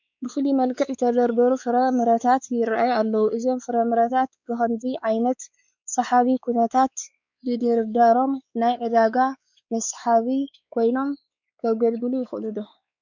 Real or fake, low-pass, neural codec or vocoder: fake; 7.2 kHz; codec, 16 kHz, 4 kbps, X-Codec, WavLM features, trained on Multilingual LibriSpeech